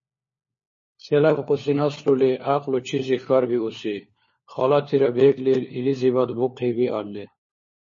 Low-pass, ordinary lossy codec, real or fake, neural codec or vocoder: 7.2 kHz; MP3, 32 kbps; fake; codec, 16 kHz, 4 kbps, FunCodec, trained on LibriTTS, 50 frames a second